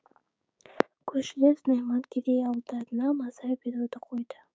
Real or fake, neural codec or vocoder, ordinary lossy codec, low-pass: fake; codec, 16 kHz, 6 kbps, DAC; none; none